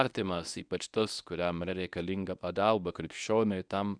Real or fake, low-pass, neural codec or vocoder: fake; 9.9 kHz; codec, 24 kHz, 0.9 kbps, WavTokenizer, medium speech release version 1